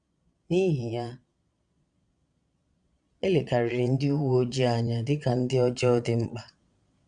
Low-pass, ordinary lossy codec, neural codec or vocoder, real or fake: 9.9 kHz; none; vocoder, 22.05 kHz, 80 mel bands, Vocos; fake